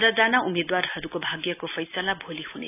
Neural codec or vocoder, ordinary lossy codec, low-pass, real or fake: none; none; 3.6 kHz; real